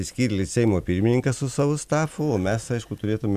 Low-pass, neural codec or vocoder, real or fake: 14.4 kHz; none; real